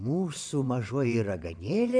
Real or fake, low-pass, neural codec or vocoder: fake; 9.9 kHz; vocoder, 22.05 kHz, 80 mel bands, WaveNeXt